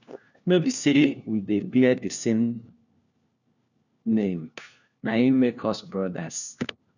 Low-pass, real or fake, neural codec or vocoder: 7.2 kHz; fake; codec, 16 kHz, 1 kbps, FunCodec, trained on LibriTTS, 50 frames a second